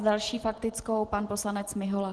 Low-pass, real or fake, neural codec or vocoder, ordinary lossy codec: 10.8 kHz; real; none; Opus, 16 kbps